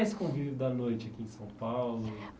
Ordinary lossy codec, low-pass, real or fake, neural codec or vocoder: none; none; real; none